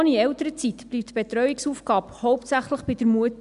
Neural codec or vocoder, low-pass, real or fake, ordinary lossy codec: none; 10.8 kHz; real; none